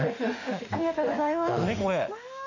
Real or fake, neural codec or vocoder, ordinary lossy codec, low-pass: fake; autoencoder, 48 kHz, 32 numbers a frame, DAC-VAE, trained on Japanese speech; none; 7.2 kHz